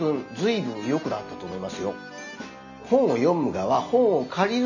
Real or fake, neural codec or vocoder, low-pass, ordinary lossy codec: real; none; 7.2 kHz; none